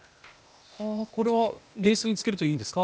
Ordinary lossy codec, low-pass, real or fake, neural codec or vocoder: none; none; fake; codec, 16 kHz, 0.8 kbps, ZipCodec